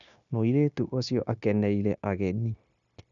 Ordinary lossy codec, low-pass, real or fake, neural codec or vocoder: none; 7.2 kHz; fake; codec, 16 kHz, 0.9 kbps, LongCat-Audio-Codec